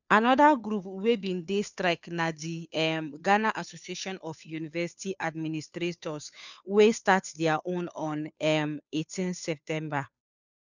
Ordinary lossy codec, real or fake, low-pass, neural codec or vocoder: none; fake; 7.2 kHz; codec, 16 kHz, 2 kbps, FunCodec, trained on Chinese and English, 25 frames a second